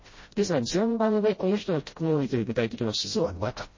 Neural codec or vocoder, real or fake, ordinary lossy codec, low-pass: codec, 16 kHz, 0.5 kbps, FreqCodec, smaller model; fake; MP3, 32 kbps; 7.2 kHz